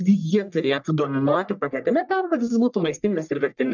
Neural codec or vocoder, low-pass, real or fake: codec, 44.1 kHz, 1.7 kbps, Pupu-Codec; 7.2 kHz; fake